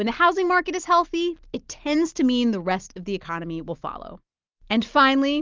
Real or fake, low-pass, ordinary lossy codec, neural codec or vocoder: real; 7.2 kHz; Opus, 32 kbps; none